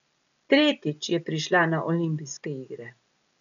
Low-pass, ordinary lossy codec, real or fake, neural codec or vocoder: 7.2 kHz; MP3, 96 kbps; real; none